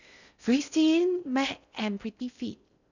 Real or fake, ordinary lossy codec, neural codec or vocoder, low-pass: fake; none; codec, 16 kHz in and 24 kHz out, 0.6 kbps, FocalCodec, streaming, 4096 codes; 7.2 kHz